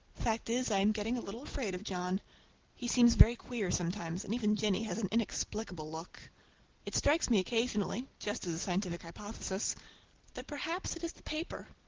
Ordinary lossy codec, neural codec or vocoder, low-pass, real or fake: Opus, 16 kbps; none; 7.2 kHz; real